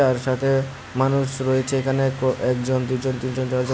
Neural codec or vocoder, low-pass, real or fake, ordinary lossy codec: none; none; real; none